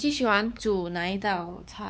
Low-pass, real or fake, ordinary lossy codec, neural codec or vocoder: none; fake; none; codec, 16 kHz, 4 kbps, X-Codec, WavLM features, trained on Multilingual LibriSpeech